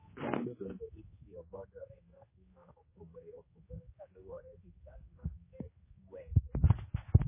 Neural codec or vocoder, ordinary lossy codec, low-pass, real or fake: codec, 16 kHz, 2 kbps, X-Codec, HuBERT features, trained on general audio; MP3, 16 kbps; 3.6 kHz; fake